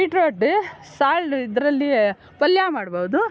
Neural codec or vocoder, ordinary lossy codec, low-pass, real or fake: none; none; none; real